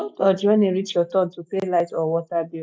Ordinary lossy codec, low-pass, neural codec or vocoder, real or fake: none; none; none; real